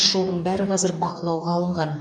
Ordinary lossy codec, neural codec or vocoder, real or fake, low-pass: none; codec, 44.1 kHz, 2.6 kbps, DAC; fake; 9.9 kHz